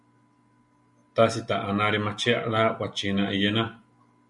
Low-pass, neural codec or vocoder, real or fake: 10.8 kHz; none; real